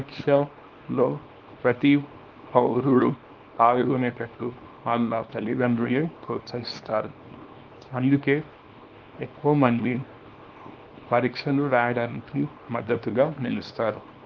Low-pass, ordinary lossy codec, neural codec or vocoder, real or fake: 7.2 kHz; Opus, 32 kbps; codec, 24 kHz, 0.9 kbps, WavTokenizer, small release; fake